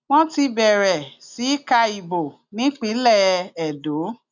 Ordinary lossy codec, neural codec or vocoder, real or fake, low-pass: none; none; real; 7.2 kHz